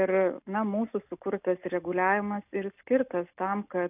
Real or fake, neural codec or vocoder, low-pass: real; none; 3.6 kHz